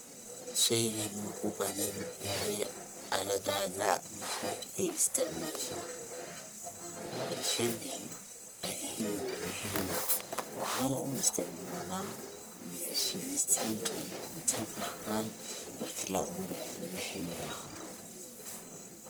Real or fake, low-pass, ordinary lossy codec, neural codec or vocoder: fake; none; none; codec, 44.1 kHz, 1.7 kbps, Pupu-Codec